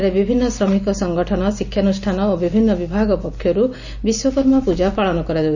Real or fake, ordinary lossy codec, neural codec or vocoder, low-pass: real; none; none; 7.2 kHz